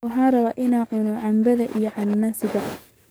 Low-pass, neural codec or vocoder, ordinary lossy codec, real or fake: none; codec, 44.1 kHz, 7.8 kbps, DAC; none; fake